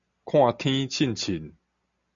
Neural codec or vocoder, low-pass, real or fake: none; 7.2 kHz; real